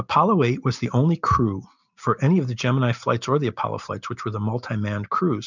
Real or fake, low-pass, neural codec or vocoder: real; 7.2 kHz; none